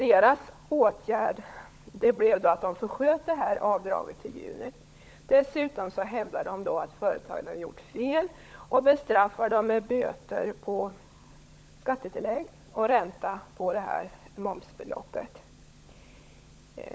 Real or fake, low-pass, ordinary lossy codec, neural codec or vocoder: fake; none; none; codec, 16 kHz, 16 kbps, FunCodec, trained on LibriTTS, 50 frames a second